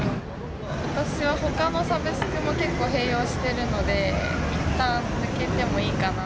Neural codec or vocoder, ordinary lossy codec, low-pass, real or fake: none; none; none; real